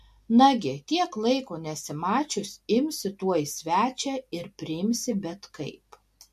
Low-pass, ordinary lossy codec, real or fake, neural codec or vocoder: 14.4 kHz; MP3, 64 kbps; real; none